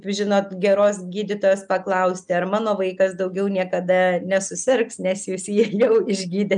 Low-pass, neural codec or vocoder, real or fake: 9.9 kHz; none; real